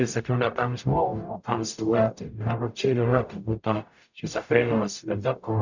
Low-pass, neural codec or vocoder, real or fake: 7.2 kHz; codec, 44.1 kHz, 0.9 kbps, DAC; fake